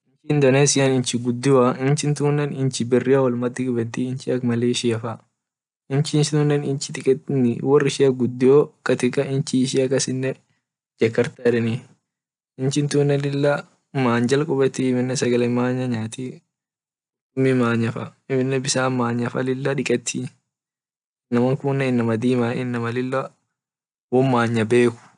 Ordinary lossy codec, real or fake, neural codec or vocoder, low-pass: none; real; none; 9.9 kHz